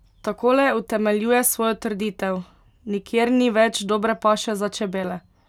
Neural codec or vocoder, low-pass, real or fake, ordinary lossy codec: none; 19.8 kHz; real; none